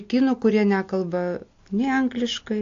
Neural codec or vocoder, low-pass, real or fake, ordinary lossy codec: none; 7.2 kHz; real; AAC, 48 kbps